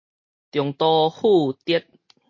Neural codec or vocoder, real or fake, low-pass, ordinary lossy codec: none; real; 7.2 kHz; MP3, 32 kbps